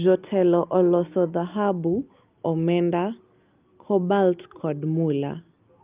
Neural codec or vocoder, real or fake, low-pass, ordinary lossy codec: none; real; 3.6 kHz; Opus, 24 kbps